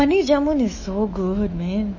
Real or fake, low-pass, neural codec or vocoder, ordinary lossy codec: fake; 7.2 kHz; autoencoder, 48 kHz, 128 numbers a frame, DAC-VAE, trained on Japanese speech; MP3, 32 kbps